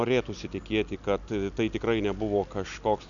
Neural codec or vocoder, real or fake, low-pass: none; real; 7.2 kHz